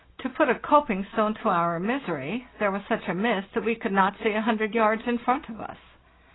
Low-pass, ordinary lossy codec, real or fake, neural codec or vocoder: 7.2 kHz; AAC, 16 kbps; real; none